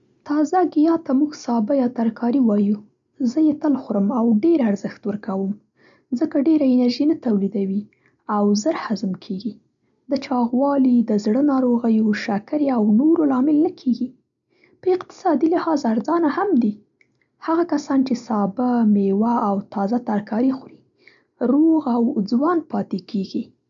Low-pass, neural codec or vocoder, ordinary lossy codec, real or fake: 7.2 kHz; none; none; real